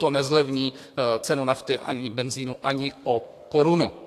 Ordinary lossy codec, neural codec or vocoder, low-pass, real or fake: AAC, 64 kbps; codec, 32 kHz, 1.9 kbps, SNAC; 14.4 kHz; fake